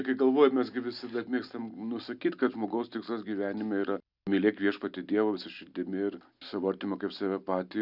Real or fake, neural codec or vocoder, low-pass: real; none; 5.4 kHz